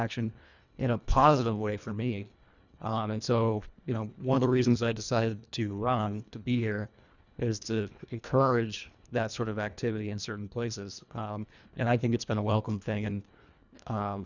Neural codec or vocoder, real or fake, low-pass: codec, 24 kHz, 1.5 kbps, HILCodec; fake; 7.2 kHz